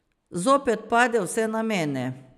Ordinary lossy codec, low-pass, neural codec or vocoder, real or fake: none; 14.4 kHz; none; real